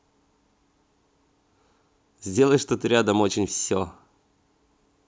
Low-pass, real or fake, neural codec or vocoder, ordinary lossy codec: none; real; none; none